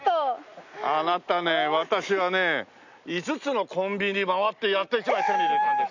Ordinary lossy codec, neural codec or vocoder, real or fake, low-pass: none; none; real; 7.2 kHz